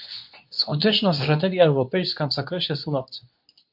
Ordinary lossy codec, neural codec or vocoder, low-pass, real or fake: MP3, 48 kbps; codec, 24 kHz, 0.9 kbps, WavTokenizer, medium speech release version 2; 5.4 kHz; fake